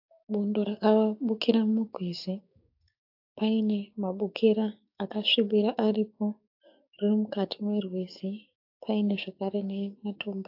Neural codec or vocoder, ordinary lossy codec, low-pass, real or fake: codec, 16 kHz, 6 kbps, DAC; AAC, 48 kbps; 5.4 kHz; fake